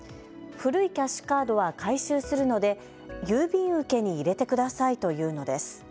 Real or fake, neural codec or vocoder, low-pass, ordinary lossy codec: real; none; none; none